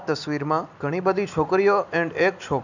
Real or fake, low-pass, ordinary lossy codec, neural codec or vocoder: real; 7.2 kHz; none; none